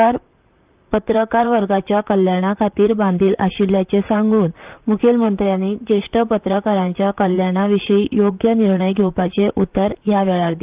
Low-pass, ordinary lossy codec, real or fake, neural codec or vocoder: 3.6 kHz; Opus, 32 kbps; fake; vocoder, 44.1 kHz, 128 mel bands, Pupu-Vocoder